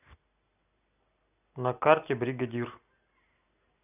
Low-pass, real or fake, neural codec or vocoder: 3.6 kHz; real; none